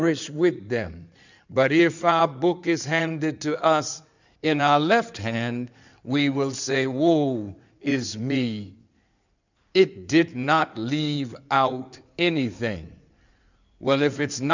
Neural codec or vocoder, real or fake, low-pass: codec, 16 kHz in and 24 kHz out, 2.2 kbps, FireRedTTS-2 codec; fake; 7.2 kHz